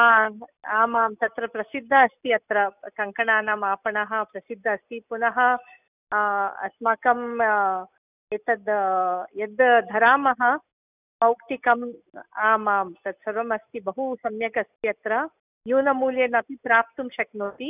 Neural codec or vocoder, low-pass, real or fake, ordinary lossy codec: none; 3.6 kHz; real; none